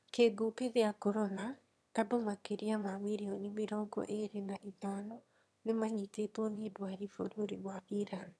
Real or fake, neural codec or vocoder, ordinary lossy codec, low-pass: fake; autoencoder, 22.05 kHz, a latent of 192 numbers a frame, VITS, trained on one speaker; none; none